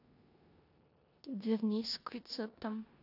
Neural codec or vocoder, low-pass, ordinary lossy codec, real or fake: codec, 16 kHz in and 24 kHz out, 0.9 kbps, LongCat-Audio-Codec, four codebook decoder; 5.4 kHz; AAC, 32 kbps; fake